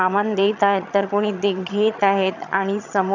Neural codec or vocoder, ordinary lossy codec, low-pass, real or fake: vocoder, 22.05 kHz, 80 mel bands, HiFi-GAN; none; 7.2 kHz; fake